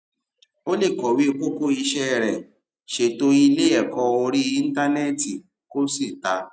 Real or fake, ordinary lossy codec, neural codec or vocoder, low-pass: real; none; none; none